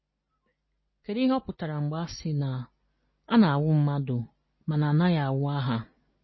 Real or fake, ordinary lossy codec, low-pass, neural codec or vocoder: real; MP3, 24 kbps; 7.2 kHz; none